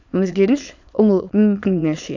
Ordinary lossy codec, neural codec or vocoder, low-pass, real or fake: none; autoencoder, 22.05 kHz, a latent of 192 numbers a frame, VITS, trained on many speakers; 7.2 kHz; fake